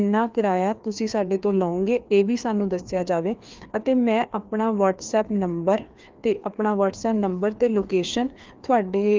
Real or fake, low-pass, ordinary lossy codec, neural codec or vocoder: fake; 7.2 kHz; Opus, 24 kbps; codec, 16 kHz, 2 kbps, FreqCodec, larger model